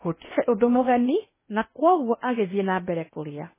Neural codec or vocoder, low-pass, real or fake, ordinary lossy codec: codec, 16 kHz in and 24 kHz out, 0.8 kbps, FocalCodec, streaming, 65536 codes; 3.6 kHz; fake; MP3, 16 kbps